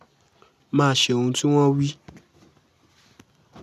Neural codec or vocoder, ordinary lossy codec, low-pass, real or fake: none; none; 14.4 kHz; real